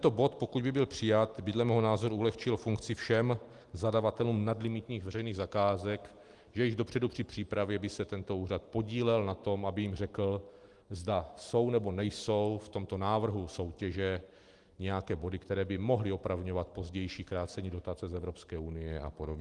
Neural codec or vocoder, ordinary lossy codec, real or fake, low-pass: none; Opus, 24 kbps; real; 10.8 kHz